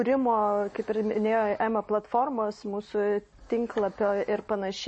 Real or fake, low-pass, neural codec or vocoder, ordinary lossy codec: real; 9.9 kHz; none; MP3, 32 kbps